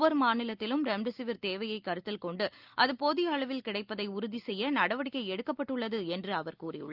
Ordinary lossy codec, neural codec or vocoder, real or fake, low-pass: Opus, 24 kbps; none; real; 5.4 kHz